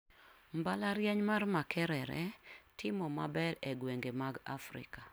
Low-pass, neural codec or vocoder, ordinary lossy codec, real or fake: none; none; none; real